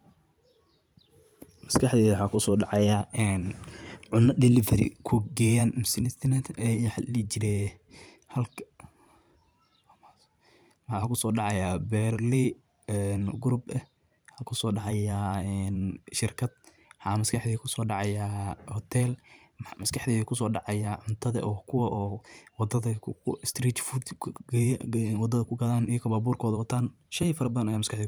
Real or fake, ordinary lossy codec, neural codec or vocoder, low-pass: fake; none; vocoder, 44.1 kHz, 128 mel bands every 512 samples, BigVGAN v2; none